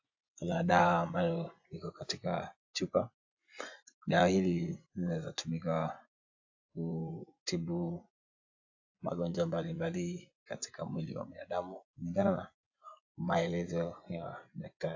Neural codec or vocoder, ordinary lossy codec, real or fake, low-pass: vocoder, 24 kHz, 100 mel bands, Vocos; AAC, 48 kbps; fake; 7.2 kHz